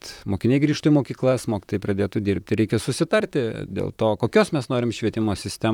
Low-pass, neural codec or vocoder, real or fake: 19.8 kHz; vocoder, 44.1 kHz, 128 mel bands, Pupu-Vocoder; fake